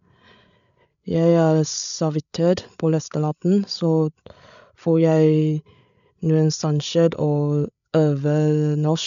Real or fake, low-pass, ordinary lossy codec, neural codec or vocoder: fake; 7.2 kHz; none; codec, 16 kHz, 8 kbps, FreqCodec, larger model